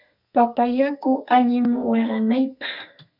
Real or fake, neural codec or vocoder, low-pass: fake; codec, 32 kHz, 1.9 kbps, SNAC; 5.4 kHz